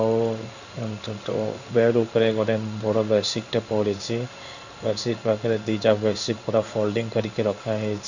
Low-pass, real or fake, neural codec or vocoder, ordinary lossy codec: 7.2 kHz; fake; codec, 16 kHz in and 24 kHz out, 1 kbps, XY-Tokenizer; none